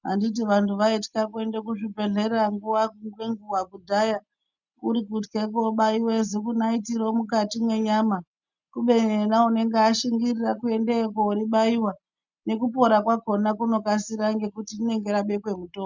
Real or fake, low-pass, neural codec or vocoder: real; 7.2 kHz; none